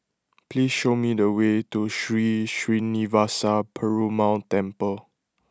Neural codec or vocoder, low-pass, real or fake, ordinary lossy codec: none; none; real; none